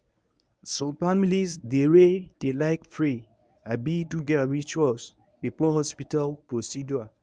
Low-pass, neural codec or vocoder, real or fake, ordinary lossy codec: 9.9 kHz; codec, 24 kHz, 0.9 kbps, WavTokenizer, medium speech release version 1; fake; none